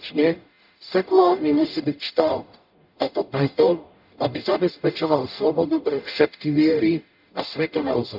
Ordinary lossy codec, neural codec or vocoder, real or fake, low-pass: none; codec, 44.1 kHz, 0.9 kbps, DAC; fake; 5.4 kHz